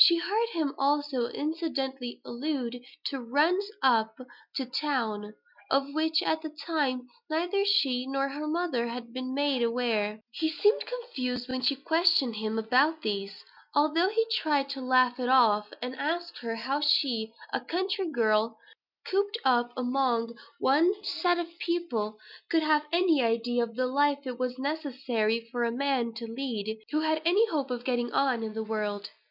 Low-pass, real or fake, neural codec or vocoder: 5.4 kHz; real; none